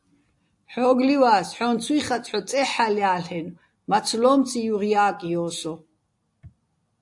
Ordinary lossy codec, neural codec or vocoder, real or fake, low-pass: AAC, 64 kbps; none; real; 10.8 kHz